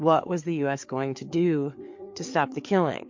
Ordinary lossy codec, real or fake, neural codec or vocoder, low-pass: MP3, 48 kbps; fake; codec, 16 kHz, 4 kbps, FreqCodec, larger model; 7.2 kHz